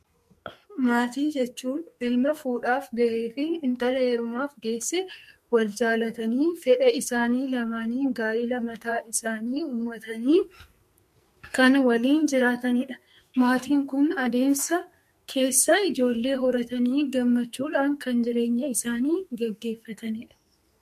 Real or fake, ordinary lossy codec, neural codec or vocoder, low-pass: fake; MP3, 64 kbps; codec, 44.1 kHz, 2.6 kbps, SNAC; 14.4 kHz